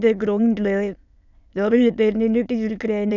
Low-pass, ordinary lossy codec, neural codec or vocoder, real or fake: 7.2 kHz; none; autoencoder, 22.05 kHz, a latent of 192 numbers a frame, VITS, trained on many speakers; fake